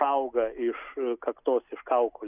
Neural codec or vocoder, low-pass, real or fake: none; 3.6 kHz; real